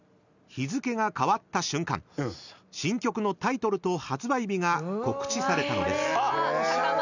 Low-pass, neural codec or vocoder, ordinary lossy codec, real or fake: 7.2 kHz; none; none; real